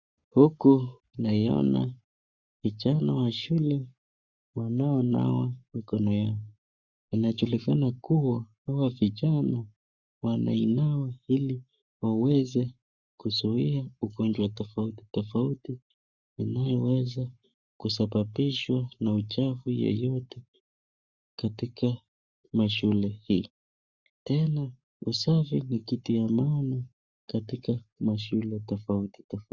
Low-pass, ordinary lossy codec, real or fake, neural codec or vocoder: 7.2 kHz; Opus, 64 kbps; fake; codec, 44.1 kHz, 7.8 kbps, Pupu-Codec